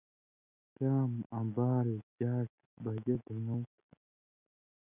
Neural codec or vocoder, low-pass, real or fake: none; 3.6 kHz; real